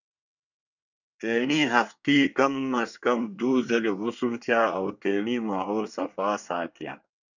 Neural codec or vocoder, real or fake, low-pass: codec, 24 kHz, 1 kbps, SNAC; fake; 7.2 kHz